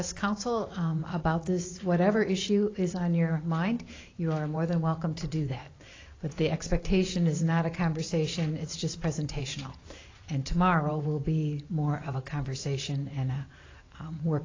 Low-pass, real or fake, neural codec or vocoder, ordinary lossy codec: 7.2 kHz; fake; vocoder, 44.1 kHz, 128 mel bands every 512 samples, BigVGAN v2; AAC, 32 kbps